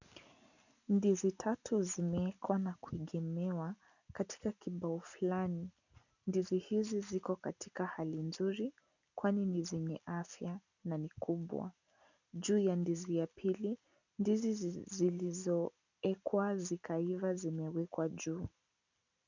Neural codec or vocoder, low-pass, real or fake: none; 7.2 kHz; real